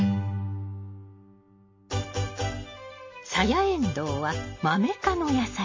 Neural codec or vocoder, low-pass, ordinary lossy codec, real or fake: none; 7.2 kHz; MP3, 32 kbps; real